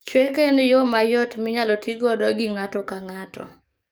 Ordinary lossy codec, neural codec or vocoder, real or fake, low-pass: none; codec, 44.1 kHz, 7.8 kbps, DAC; fake; none